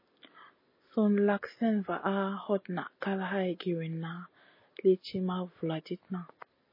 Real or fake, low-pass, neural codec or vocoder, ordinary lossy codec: real; 5.4 kHz; none; MP3, 24 kbps